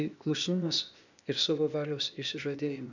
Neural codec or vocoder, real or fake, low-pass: codec, 16 kHz, 0.8 kbps, ZipCodec; fake; 7.2 kHz